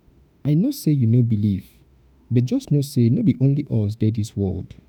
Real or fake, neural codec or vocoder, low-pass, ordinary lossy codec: fake; autoencoder, 48 kHz, 32 numbers a frame, DAC-VAE, trained on Japanese speech; none; none